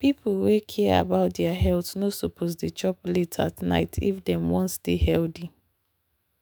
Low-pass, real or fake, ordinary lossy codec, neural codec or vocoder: none; fake; none; autoencoder, 48 kHz, 128 numbers a frame, DAC-VAE, trained on Japanese speech